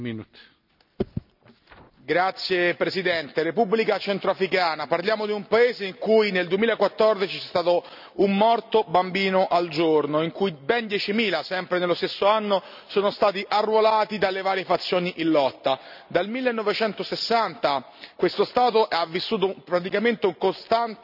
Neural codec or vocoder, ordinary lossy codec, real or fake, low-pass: none; none; real; 5.4 kHz